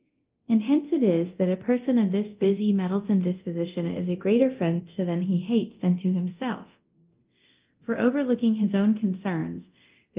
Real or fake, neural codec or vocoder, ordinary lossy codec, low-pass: fake; codec, 24 kHz, 0.9 kbps, DualCodec; Opus, 32 kbps; 3.6 kHz